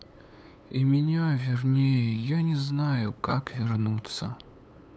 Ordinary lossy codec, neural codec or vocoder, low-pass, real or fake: none; codec, 16 kHz, 8 kbps, FunCodec, trained on LibriTTS, 25 frames a second; none; fake